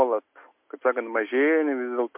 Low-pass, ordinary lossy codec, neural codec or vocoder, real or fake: 3.6 kHz; MP3, 32 kbps; none; real